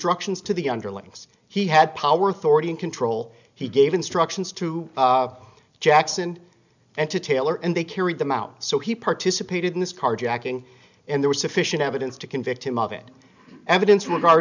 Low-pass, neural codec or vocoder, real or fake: 7.2 kHz; none; real